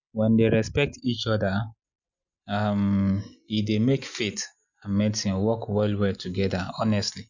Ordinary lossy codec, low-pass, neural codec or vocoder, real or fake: Opus, 64 kbps; 7.2 kHz; none; real